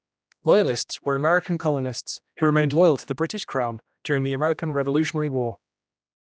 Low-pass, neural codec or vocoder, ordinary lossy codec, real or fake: none; codec, 16 kHz, 1 kbps, X-Codec, HuBERT features, trained on general audio; none; fake